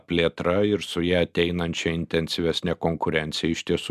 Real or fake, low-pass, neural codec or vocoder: real; 14.4 kHz; none